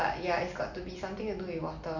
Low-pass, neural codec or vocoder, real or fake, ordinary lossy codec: 7.2 kHz; none; real; none